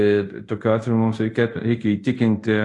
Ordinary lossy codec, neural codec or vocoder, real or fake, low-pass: AAC, 48 kbps; codec, 24 kHz, 0.5 kbps, DualCodec; fake; 10.8 kHz